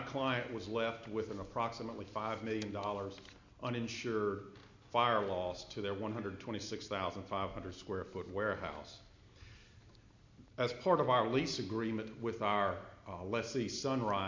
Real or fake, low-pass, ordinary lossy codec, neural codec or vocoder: real; 7.2 kHz; MP3, 48 kbps; none